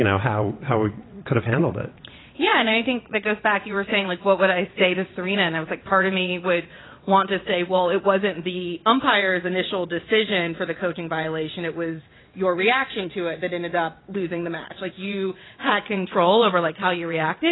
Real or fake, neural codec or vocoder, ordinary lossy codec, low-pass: fake; vocoder, 22.05 kHz, 80 mel bands, WaveNeXt; AAC, 16 kbps; 7.2 kHz